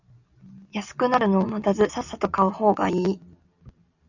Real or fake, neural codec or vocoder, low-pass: real; none; 7.2 kHz